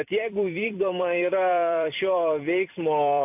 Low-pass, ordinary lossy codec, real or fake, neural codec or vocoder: 3.6 kHz; AAC, 32 kbps; fake; vocoder, 44.1 kHz, 128 mel bands every 256 samples, BigVGAN v2